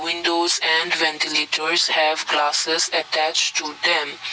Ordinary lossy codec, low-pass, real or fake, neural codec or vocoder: none; none; real; none